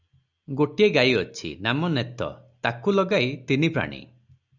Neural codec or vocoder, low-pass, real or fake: none; 7.2 kHz; real